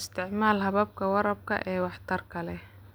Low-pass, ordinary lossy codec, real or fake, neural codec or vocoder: none; none; real; none